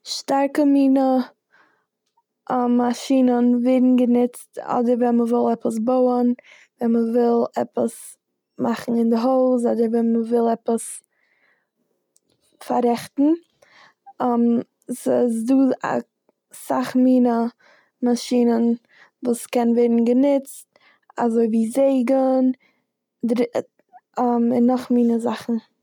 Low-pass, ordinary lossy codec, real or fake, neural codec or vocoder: 19.8 kHz; none; real; none